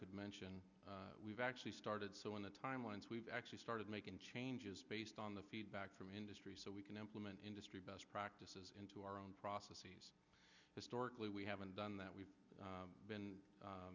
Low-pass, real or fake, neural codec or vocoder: 7.2 kHz; real; none